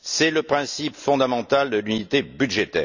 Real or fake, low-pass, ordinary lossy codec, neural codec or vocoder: real; 7.2 kHz; none; none